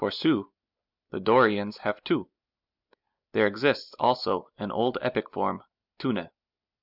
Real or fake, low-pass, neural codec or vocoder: real; 5.4 kHz; none